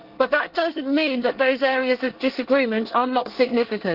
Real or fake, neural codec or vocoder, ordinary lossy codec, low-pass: fake; codec, 24 kHz, 1 kbps, SNAC; Opus, 16 kbps; 5.4 kHz